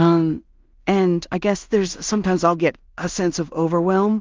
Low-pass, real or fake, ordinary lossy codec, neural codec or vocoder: 7.2 kHz; fake; Opus, 32 kbps; codec, 16 kHz in and 24 kHz out, 0.4 kbps, LongCat-Audio-Codec, two codebook decoder